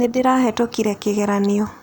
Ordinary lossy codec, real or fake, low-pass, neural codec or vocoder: none; real; none; none